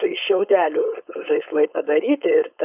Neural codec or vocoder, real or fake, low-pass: codec, 16 kHz, 4.8 kbps, FACodec; fake; 3.6 kHz